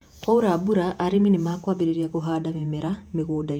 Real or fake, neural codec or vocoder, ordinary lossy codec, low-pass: fake; vocoder, 48 kHz, 128 mel bands, Vocos; none; 19.8 kHz